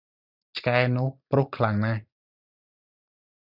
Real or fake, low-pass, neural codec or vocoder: real; 5.4 kHz; none